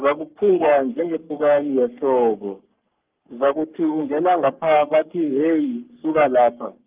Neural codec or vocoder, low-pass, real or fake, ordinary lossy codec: codec, 44.1 kHz, 3.4 kbps, Pupu-Codec; 3.6 kHz; fake; Opus, 32 kbps